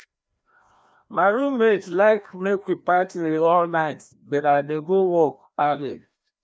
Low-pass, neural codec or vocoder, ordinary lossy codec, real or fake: none; codec, 16 kHz, 1 kbps, FreqCodec, larger model; none; fake